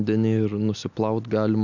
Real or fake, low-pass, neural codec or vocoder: real; 7.2 kHz; none